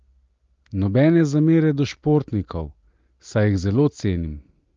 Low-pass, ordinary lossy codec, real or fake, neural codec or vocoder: 7.2 kHz; Opus, 32 kbps; real; none